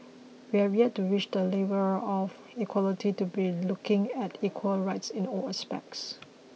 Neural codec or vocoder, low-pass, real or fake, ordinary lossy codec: none; none; real; none